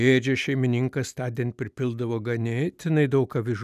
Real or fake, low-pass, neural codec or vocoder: real; 14.4 kHz; none